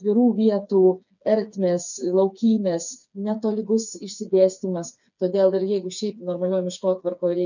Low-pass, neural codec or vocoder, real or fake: 7.2 kHz; codec, 16 kHz, 4 kbps, FreqCodec, smaller model; fake